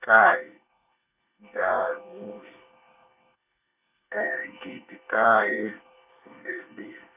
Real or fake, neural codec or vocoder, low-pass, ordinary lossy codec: fake; codec, 24 kHz, 1 kbps, SNAC; 3.6 kHz; none